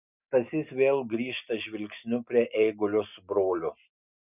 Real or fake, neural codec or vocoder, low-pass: real; none; 3.6 kHz